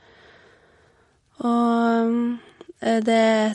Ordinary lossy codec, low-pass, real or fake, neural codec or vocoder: MP3, 48 kbps; 19.8 kHz; real; none